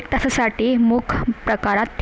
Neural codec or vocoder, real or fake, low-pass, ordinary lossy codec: none; real; none; none